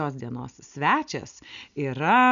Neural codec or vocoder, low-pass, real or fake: none; 7.2 kHz; real